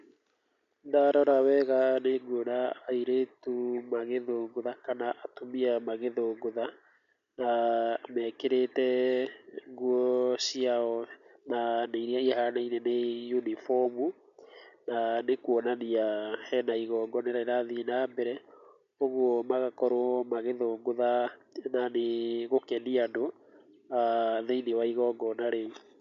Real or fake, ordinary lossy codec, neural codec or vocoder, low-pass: real; none; none; 7.2 kHz